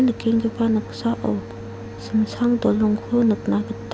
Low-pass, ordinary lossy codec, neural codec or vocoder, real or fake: none; none; none; real